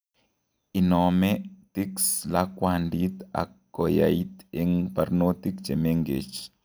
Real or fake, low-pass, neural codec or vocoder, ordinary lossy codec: real; none; none; none